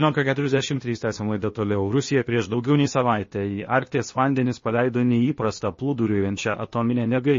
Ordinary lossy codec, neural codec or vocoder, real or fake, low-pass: MP3, 32 kbps; codec, 16 kHz, 0.8 kbps, ZipCodec; fake; 7.2 kHz